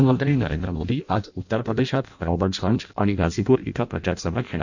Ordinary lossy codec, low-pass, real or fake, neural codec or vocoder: none; 7.2 kHz; fake; codec, 16 kHz in and 24 kHz out, 0.6 kbps, FireRedTTS-2 codec